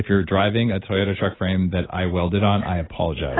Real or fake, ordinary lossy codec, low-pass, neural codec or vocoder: real; AAC, 16 kbps; 7.2 kHz; none